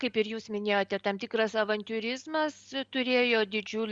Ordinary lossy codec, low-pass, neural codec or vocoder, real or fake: Opus, 64 kbps; 9.9 kHz; none; real